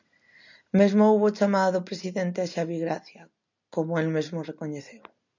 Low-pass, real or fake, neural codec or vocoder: 7.2 kHz; real; none